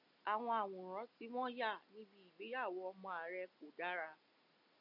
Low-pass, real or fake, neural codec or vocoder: 5.4 kHz; real; none